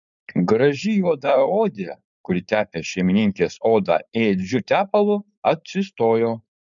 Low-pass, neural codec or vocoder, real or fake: 7.2 kHz; codec, 16 kHz, 4.8 kbps, FACodec; fake